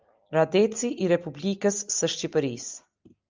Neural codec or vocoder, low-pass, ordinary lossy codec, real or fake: none; 7.2 kHz; Opus, 24 kbps; real